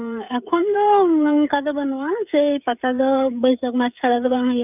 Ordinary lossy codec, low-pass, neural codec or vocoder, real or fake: none; 3.6 kHz; codec, 16 kHz, 16 kbps, FreqCodec, smaller model; fake